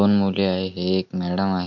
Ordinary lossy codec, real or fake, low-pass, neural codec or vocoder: none; real; 7.2 kHz; none